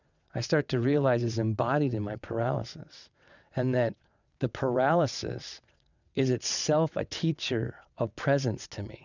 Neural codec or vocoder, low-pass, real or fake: vocoder, 22.05 kHz, 80 mel bands, WaveNeXt; 7.2 kHz; fake